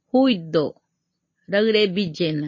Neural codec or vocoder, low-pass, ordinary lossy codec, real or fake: none; 7.2 kHz; MP3, 32 kbps; real